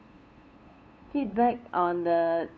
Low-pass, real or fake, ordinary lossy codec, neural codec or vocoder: none; fake; none; codec, 16 kHz, 8 kbps, FunCodec, trained on LibriTTS, 25 frames a second